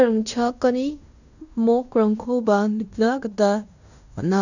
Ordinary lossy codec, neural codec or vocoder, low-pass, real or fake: none; codec, 16 kHz in and 24 kHz out, 0.9 kbps, LongCat-Audio-Codec, fine tuned four codebook decoder; 7.2 kHz; fake